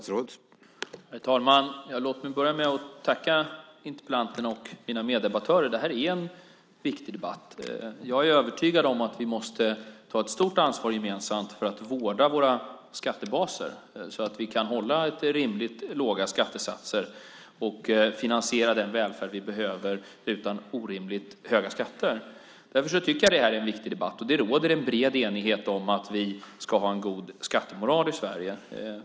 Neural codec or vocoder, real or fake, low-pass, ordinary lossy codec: none; real; none; none